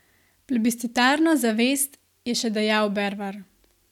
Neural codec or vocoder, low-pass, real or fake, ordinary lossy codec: none; 19.8 kHz; real; none